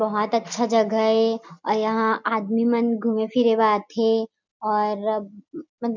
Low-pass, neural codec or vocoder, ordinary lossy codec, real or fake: 7.2 kHz; none; none; real